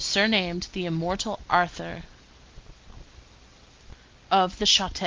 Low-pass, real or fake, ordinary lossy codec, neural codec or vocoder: 7.2 kHz; real; Opus, 32 kbps; none